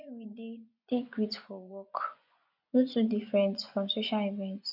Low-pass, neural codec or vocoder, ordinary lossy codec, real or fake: 5.4 kHz; vocoder, 24 kHz, 100 mel bands, Vocos; none; fake